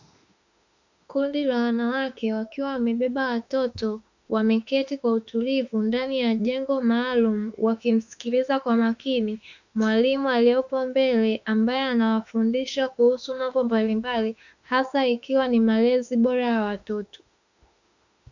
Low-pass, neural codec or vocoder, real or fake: 7.2 kHz; autoencoder, 48 kHz, 32 numbers a frame, DAC-VAE, trained on Japanese speech; fake